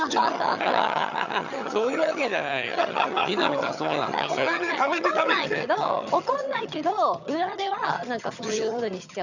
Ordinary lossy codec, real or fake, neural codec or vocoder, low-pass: none; fake; vocoder, 22.05 kHz, 80 mel bands, HiFi-GAN; 7.2 kHz